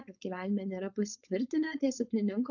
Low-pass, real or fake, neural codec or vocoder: 7.2 kHz; fake; codec, 16 kHz, 4.8 kbps, FACodec